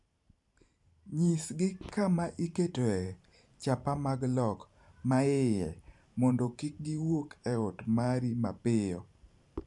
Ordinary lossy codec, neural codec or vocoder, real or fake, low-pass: none; vocoder, 44.1 kHz, 128 mel bands every 512 samples, BigVGAN v2; fake; 10.8 kHz